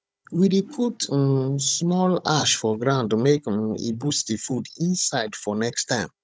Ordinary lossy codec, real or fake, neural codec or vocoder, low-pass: none; fake; codec, 16 kHz, 16 kbps, FunCodec, trained on Chinese and English, 50 frames a second; none